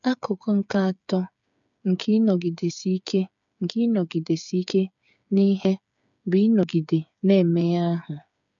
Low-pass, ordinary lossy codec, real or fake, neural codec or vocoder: 7.2 kHz; none; fake; codec, 16 kHz, 8 kbps, FreqCodec, smaller model